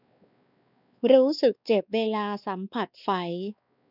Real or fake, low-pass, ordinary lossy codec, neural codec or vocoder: fake; 5.4 kHz; none; codec, 16 kHz, 2 kbps, X-Codec, WavLM features, trained on Multilingual LibriSpeech